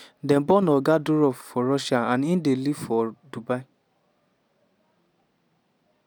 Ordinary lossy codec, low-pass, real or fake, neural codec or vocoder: none; none; real; none